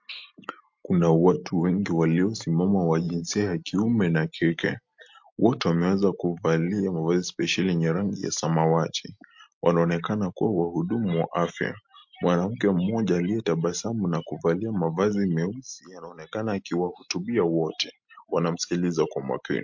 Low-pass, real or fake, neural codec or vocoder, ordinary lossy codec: 7.2 kHz; real; none; MP3, 48 kbps